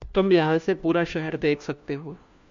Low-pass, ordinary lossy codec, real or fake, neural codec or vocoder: 7.2 kHz; MP3, 64 kbps; fake; codec, 16 kHz, 1 kbps, FunCodec, trained on LibriTTS, 50 frames a second